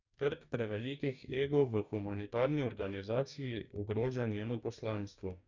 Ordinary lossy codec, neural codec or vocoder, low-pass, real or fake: none; codec, 44.1 kHz, 2.6 kbps, DAC; 7.2 kHz; fake